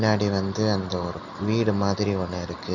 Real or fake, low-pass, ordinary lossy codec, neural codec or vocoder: real; 7.2 kHz; none; none